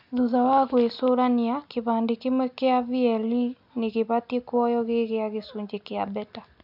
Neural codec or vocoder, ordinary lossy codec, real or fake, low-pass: none; none; real; 5.4 kHz